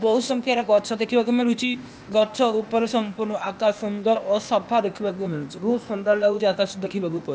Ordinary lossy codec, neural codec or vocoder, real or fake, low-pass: none; codec, 16 kHz, 0.8 kbps, ZipCodec; fake; none